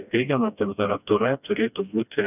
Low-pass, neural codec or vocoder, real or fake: 3.6 kHz; codec, 16 kHz, 1 kbps, FreqCodec, smaller model; fake